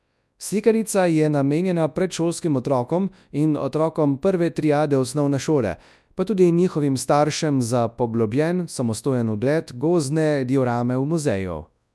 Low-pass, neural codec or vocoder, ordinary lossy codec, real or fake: none; codec, 24 kHz, 0.9 kbps, WavTokenizer, large speech release; none; fake